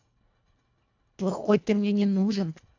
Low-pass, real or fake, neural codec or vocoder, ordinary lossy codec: 7.2 kHz; fake; codec, 24 kHz, 1.5 kbps, HILCodec; AAC, 48 kbps